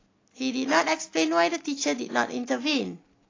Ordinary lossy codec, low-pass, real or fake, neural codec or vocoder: AAC, 32 kbps; 7.2 kHz; real; none